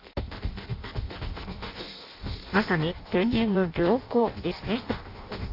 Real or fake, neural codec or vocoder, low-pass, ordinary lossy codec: fake; codec, 16 kHz in and 24 kHz out, 0.6 kbps, FireRedTTS-2 codec; 5.4 kHz; AAC, 32 kbps